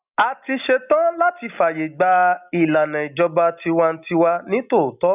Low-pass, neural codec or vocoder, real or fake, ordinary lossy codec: 3.6 kHz; none; real; none